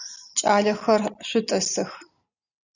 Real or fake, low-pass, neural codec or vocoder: real; 7.2 kHz; none